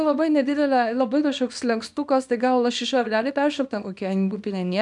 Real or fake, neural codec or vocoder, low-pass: fake; codec, 24 kHz, 0.9 kbps, WavTokenizer, small release; 10.8 kHz